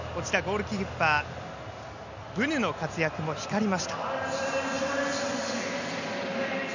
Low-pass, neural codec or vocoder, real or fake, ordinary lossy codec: 7.2 kHz; none; real; none